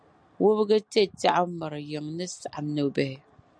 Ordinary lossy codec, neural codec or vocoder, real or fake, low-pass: MP3, 96 kbps; none; real; 9.9 kHz